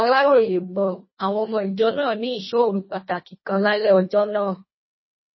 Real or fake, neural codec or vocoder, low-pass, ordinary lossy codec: fake; codec, 24 kHz, 1.5 kbps, HILCodec; 7.2 kHz; MP3, 24 kbps